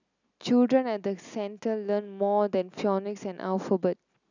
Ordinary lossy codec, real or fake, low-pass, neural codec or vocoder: none; real; 7.2 kHz; none